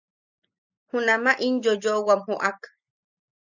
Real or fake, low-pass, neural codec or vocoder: real; 7.2 kHz; none